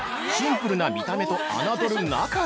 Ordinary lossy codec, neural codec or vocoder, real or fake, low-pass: none; none; real; none